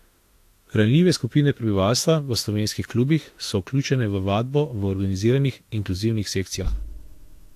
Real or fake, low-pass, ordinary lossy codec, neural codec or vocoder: fake; 14.4 kHz; AAC, 64 kbps; autoencoder, 48 kHz, 32 numbers a frame, DAC-VAE, trained on Japanese speech